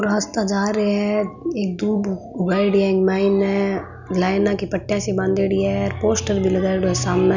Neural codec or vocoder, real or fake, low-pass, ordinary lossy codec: none; real; 7.2 kHz; none